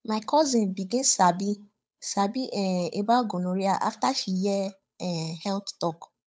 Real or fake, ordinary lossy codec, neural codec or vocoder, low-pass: fake; none; codec, 16 kHz, 8 kbps, FunCodec, trained on Chinese and English, 25 frames a second; none